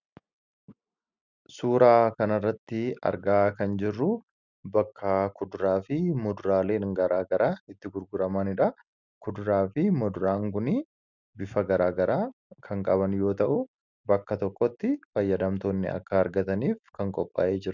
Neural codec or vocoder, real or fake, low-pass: none; real; 7.2 kHz